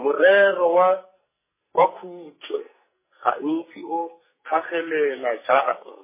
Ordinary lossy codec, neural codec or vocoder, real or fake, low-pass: MP3, 16 kbps; codec, 44.1 kHz, 2.6 kbps, SNAC; fake; 3.6 kHz